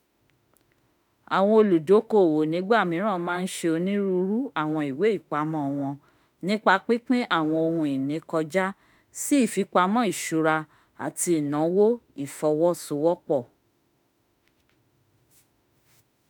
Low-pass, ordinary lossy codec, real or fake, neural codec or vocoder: none; none; fake; autoencoder, 48 kHz, 32 numbers a frame, DAC-VAE, trained on Japanese speech